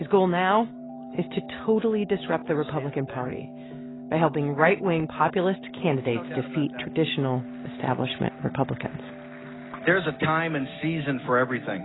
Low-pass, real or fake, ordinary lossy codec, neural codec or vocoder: 7.2 kHz; real; AAC, 16 kbps; none